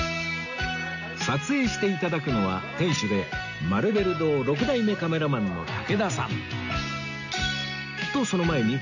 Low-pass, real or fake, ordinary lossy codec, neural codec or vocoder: 7.2 kHz; real; none; none